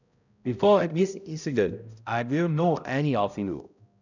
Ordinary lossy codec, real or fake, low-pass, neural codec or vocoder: none; fake; 7.2 kHz; codec, 16 kHz, 0.5 kbps, X-Codec, HuBERT features, trained on balanced general audio